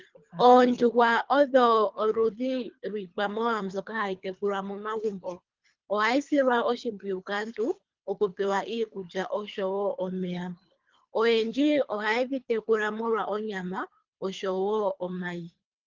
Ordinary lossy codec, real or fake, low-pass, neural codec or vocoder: Opus, 32 kbps; fake; 7.2 kHz; codec, 24 kHz, 3 kbps, HILCodec